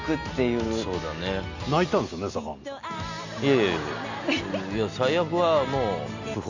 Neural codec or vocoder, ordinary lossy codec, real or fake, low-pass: none; none; real; 7.2 kHz